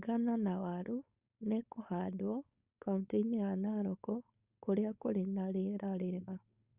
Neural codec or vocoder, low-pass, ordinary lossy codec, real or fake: codec, 16 kHz, 4.8 kbps, FACodec; 3.6 kHz; Opus, 64 kbps; fake